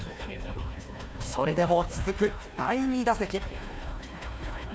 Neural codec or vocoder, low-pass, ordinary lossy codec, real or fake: codec, 16 kHz, 1 kbps, FunCodec, trained on Chinese and English, 50 frames a second; none; none; fake